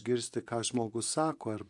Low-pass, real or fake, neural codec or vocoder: 10.8 kHz; fake; vocoder, 24 kHz, 100 mel bands, Vocos